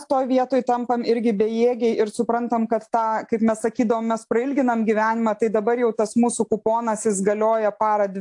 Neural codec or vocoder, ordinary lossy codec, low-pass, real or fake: none; AAC, 64 kbps; 10.8 kHz; real